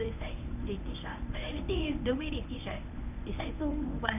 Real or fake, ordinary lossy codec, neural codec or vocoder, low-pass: fake; none; codec, 24 kHz, 0.9 kbps, WavTokenizer, medium speech release version 1; 3.6 kHz